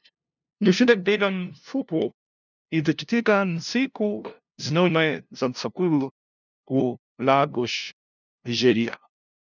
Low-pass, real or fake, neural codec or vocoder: 7.2 kHz; fake; codec, 16 kHz, 0.5 kbps, FunCodec, trained on LibriTTS, 25 frames a second